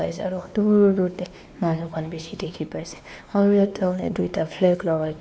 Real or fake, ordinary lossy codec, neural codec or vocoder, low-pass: fake; none; codec, 16 kHz, 2 kbps, X-Codec, WavLM features, trained on Multilingual LibriSpeech; none